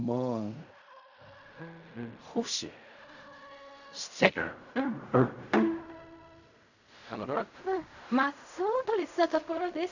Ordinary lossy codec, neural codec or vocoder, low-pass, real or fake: none; codec, 16 kHz in and 24 kHz out, 0.4 kbps, LongCat-Audio-Codec, fine tuned four codebook decoder; 7.2 kHz; fake